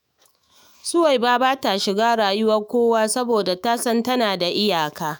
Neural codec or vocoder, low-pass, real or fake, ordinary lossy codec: autoencoder, 48 kHz, 128 numbers a frame, DAC-VAE, trained on Japanese speech; none; fake; none